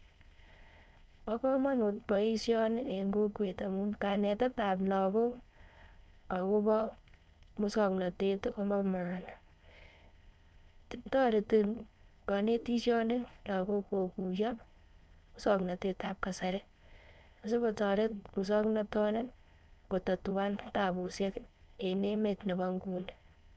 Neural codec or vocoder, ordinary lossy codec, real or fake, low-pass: codec, 16 kHz, 4.8 kbps, FACodec; none; fake; none